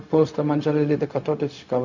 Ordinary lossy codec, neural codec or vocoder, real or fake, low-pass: none; codec, 16 kHz, 0.4 kbps, LongCat-Audio-Codec; fake; 7.2 kHz